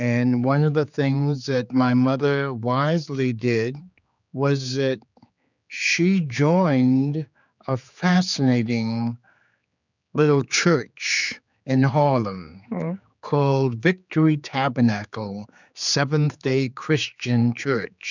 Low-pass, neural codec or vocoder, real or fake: 7.2 kHz; codec, 16 kHz, 4 kbps, X-Codec, HuBERT features, trained on general audio; fake